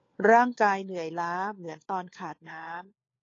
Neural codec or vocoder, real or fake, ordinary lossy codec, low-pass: codec, 16 kHz, 4 kbps, FunCodec, trained on LibriTTS, 50 frames a second; fake; AAC, 32 kbps; 7.2 kHz